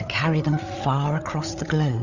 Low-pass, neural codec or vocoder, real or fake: 7.2 kHz; codec, 16 kHz, 8 kbps, FreqCodec, larger model; fake